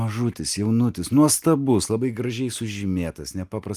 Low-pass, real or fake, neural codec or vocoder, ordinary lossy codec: 14.4 kHz; real; none; Opus, 32 kbps